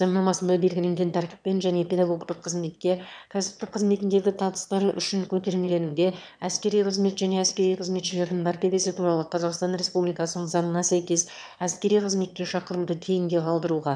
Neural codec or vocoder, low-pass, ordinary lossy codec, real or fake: autoencoder, 22.05 kHz, a latent of 192 numbers a frame, VITS, trained on one speaker; 9.9 kHz; none; fake